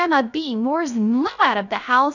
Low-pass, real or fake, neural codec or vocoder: 7.2 kHz; fake; codec, 16 kHz, 0.3 kbps, FocalCodec